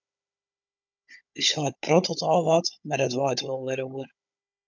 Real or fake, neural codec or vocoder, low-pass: fake; codec, 16 kHz, 16 kbps, FunCodec, trained on Chinese and English, 50 frames a second; 7.2 kHz